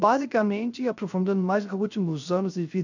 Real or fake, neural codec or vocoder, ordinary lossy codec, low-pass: fake; codec, 16 kHz, 0.3 kbps, FocalCodec; none; 7.2 kHz